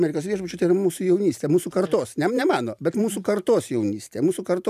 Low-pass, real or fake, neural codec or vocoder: 14.4 kHz; fake; vocoder, 44.1 kHz, 128 mel bands every 512 samples, BigVGAN v2